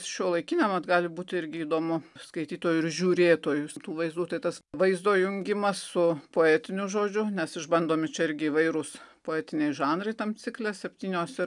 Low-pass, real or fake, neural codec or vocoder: 10.8 kHz; real; none